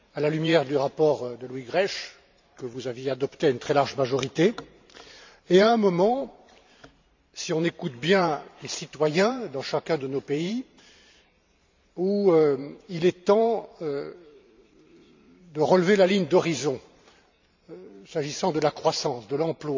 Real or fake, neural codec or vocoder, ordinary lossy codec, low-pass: fake; vocoder, 44.1 kHz, 128 mel bands every 512 samples, BigVGAN v2; MP3, 64 kbps; 7.2 kHz